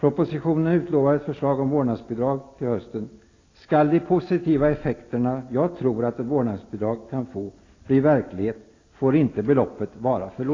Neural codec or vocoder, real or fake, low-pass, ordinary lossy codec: none; real; 7.2 kHz; AAC, 32 kbps